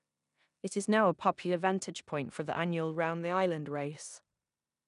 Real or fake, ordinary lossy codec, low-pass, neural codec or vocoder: fake; none; 10.8 kHz; codec, 16 kHz in and 24 kHz out, 0.9 kbps, LongCat-Audio-Codec, four codebook decoder